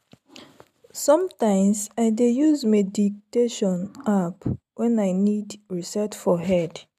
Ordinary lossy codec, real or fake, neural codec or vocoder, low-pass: MP3, 96 kbps; real; none; 14.4 kHz